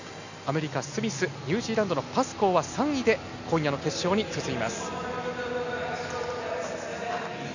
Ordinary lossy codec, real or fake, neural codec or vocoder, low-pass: none; real; none; 7.2 kHz